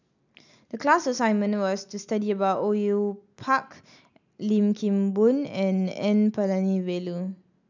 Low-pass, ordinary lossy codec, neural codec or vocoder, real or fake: 7.2 kHz; none; none; real